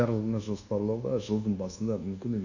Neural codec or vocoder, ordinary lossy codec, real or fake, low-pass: codec, 24 kHz, 1.2 kbps, DualCodec; none; fake; 7.2 kHz